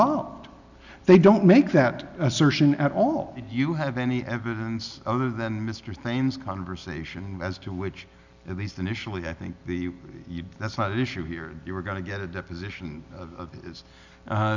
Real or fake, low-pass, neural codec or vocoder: real; 7.2 kHz; none